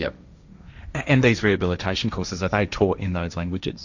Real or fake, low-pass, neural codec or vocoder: fake; 7.2 kHz; codec, 16 kHz, 1.1 kbps, Voila-Tokenizer